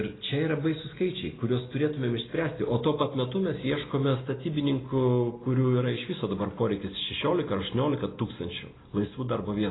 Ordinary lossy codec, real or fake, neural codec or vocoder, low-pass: AAC, 16 kbps; real; none; 7.2 kHz